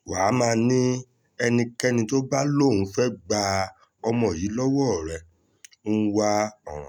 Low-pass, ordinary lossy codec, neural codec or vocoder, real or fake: 19.8 kHz; none; none; real